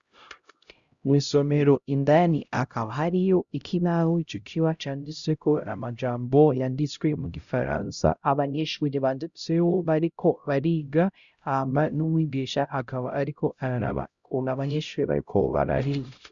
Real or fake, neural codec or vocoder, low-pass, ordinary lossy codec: fake; codec, 16 kHz, 0.5 kbps, X-Codec, HuBERT features, trained on LibriSpeech; 7.2 kHz; Opus, 64 kbps